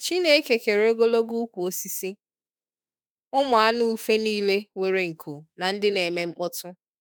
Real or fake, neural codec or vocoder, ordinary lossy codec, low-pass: fake; autoencoder, 48 kHz, 32 numbers a frame, DAC-VAE, trained on Japanese speech; none; none